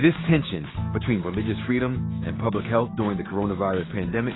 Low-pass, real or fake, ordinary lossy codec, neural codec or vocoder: 7.2 kHz; fake; AAC, 16 kbps; codec, 16 kHz, 6 kbps, DAC